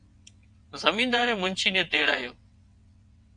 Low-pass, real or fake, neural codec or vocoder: 9.9 kHz; fake; vocoder, 22.05 kHz, 80 mel bands, WaveNeXt